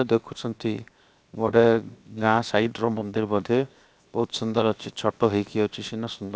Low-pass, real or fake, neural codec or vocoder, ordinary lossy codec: none; fake; codec, 16 kHz, 0.7 kbps, FocalCodec; none